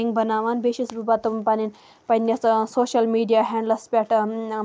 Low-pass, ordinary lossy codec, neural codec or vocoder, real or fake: none; none; none; real